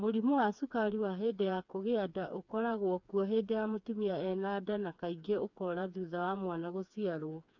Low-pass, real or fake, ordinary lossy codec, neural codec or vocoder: 7.2 kHz; fake; none; codec, 16 kHz, 4 kbps, FreqCodec, smaller model